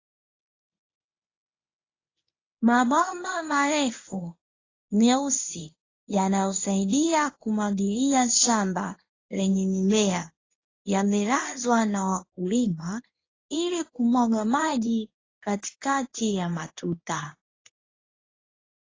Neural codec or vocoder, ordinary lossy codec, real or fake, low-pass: codec, 24 kHz, 0.9 kbps, WavTokenizer, medium speech release version 1; AAC, 32 kbps; fake; 7.2 kHz